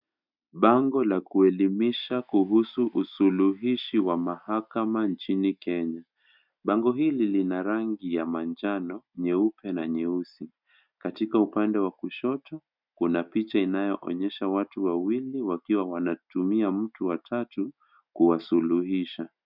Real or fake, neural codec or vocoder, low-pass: real; none; 5.4 kHz